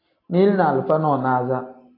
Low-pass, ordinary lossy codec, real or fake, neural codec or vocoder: 5.4 kHz; AAC, 24 kbps; real; none